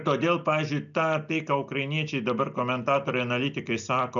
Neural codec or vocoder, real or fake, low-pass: none; real; 7.2 kHz